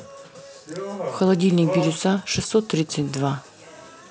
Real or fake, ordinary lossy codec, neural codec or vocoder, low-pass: real; none; none; none